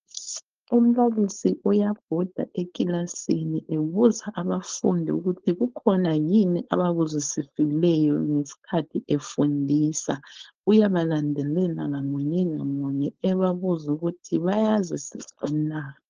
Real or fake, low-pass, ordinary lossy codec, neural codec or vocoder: fake; 7.2 kHz; Opus, 16 kbps; codec, 16 kHz, 4.8 kbps, FACodec